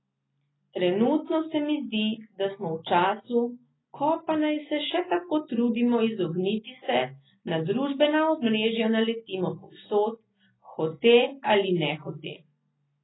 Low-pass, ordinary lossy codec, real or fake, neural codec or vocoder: 7.2 kHz; AAC, 16 kbps; real; none